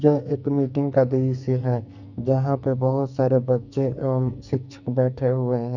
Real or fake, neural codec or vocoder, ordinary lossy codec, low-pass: fake; codec, 44.1 kHz, 2.6 kbps, SNAC; none; 7.2 kHz